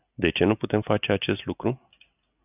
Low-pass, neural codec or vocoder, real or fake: 3.6 kHz; none; real